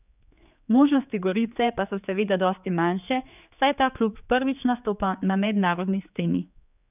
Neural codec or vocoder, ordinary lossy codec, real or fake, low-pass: codec, 16 kHz, 4 kbps, X-Codec, HuBERT features, trained on general audio; none; fake; 3.6 kHz